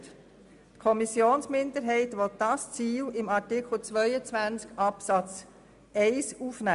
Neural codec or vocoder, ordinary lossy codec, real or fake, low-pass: none; AAC, 96 kbps; real; 10.8 kHz